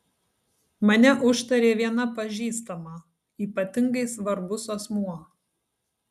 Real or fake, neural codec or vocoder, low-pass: real; none; 14.4 kHz